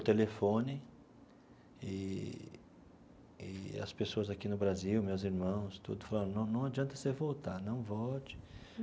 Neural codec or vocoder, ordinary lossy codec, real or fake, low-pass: none; none; real; none